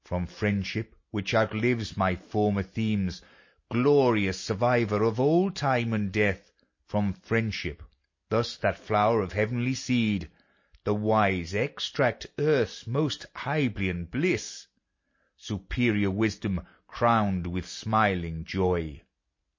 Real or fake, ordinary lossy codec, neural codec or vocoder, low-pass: real; MP3, 32 kbps; none; 7.2 kHz